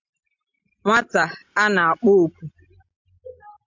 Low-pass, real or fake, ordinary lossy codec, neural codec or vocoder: 7.2 kHz; real; MP3, 64 kbps; none